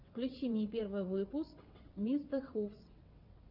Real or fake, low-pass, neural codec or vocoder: fake; 5.4 kHz; vocoder, 22.05 kHz, 80 mel bands, WaveNeXt